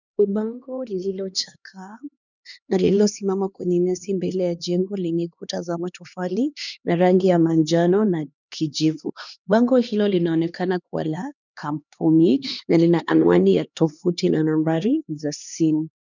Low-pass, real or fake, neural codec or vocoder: 7.2 kHz; fake; codec, 16 kHz, 2 kbps, X-Codec, HuBERT features, trained on LibriSpeech